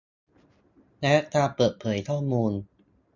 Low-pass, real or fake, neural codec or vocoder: 7.2 kHz; real; none